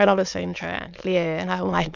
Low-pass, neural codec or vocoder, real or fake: 7.2 kHz; autoencoder, 22.05 kHz, a latent of 192 numbers a frame, VITS, trained on many speakers; fake